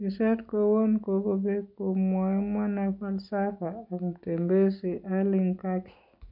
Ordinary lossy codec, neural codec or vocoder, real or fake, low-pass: none; none; real; 5.4 kHz